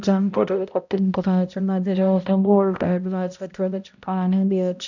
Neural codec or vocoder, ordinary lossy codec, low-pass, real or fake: codec, 16 kHz, 0.5 kbps, X-Codec, HuBERT features, trained on balanced general audio; none; 7.2 kHz; fake